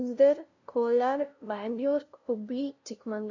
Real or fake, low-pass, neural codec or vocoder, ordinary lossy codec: fake; 7.2 kHz; codec, 16 kHz, 0.5 kbps, FunCodec, trained on LibriTTS, 25 frames a second; none